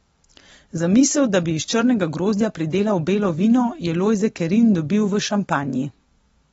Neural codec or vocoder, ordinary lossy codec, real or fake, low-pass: none; AAC, 24 kbps; real; 19.8 kHz